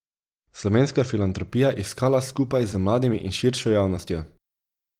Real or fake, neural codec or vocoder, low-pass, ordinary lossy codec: fake; vocoder, 44.1 kHz, 128 mel bands every 256 samples, BigVGAN v2; 19.8 kHz; Opus, 24 kbps